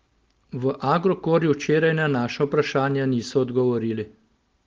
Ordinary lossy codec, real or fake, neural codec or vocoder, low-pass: Opus, 16 kbps; real; none; 7.2 kHz